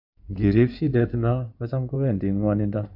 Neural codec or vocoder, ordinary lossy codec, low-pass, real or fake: codec, 16 kHz in and 24 kHz out, 2.2 kbps, FireRedTTS-2 codec; AAC, 48 kbps; 5.4 kHz; fake